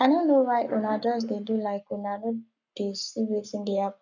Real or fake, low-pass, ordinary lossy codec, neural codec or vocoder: fake; 7.2 kHz; none; codec, 44.1 kHz, 7.8 kbps, Pupu-Codec